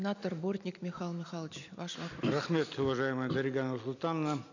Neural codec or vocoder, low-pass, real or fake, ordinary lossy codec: none; 7.2 kHz; real; none